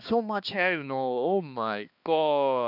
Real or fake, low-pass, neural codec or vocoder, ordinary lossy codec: fake; 5.4 kHz; codec, 16 kHz, 2 kbps, X-Codec, HuBERT features, trained on balanced general audio; none